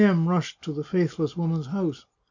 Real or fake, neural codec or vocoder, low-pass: real; none; 7.2 kHz